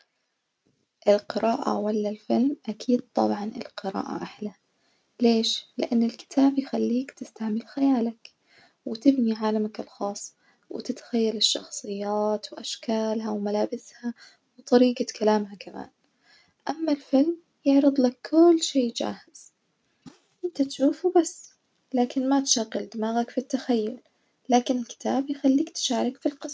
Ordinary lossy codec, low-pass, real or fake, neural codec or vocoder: none; none; real; none